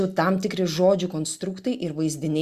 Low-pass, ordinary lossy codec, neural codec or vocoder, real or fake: 14.4 kHz; Opus, 64 kbps; none; real